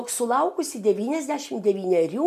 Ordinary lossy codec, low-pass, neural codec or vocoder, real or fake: MP3, 96 kbps; 14.4 kHz; none; real